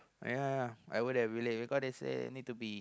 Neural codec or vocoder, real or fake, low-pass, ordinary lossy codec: none; real; none; none